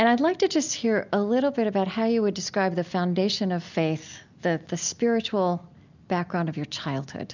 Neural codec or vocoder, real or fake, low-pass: none; real; 7.2 kHz